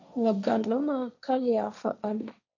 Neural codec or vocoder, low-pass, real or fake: codec, 16 kHz, 1.1 kbps, Voila-Tokenizer; 7.2 kHz; fake